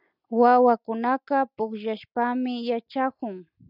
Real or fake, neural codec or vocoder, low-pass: real; none; 5.4 kHz